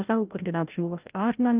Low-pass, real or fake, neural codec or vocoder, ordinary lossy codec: 3.6 kHz; fake; codec, 16 kHz, 0.5 kbps, FreqCodec, larger model; Opus, 24 kbps